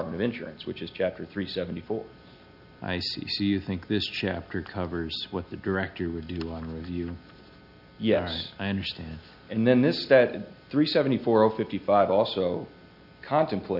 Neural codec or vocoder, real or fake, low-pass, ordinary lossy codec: none; real; 5.4 kHz; AAC, 48 kbps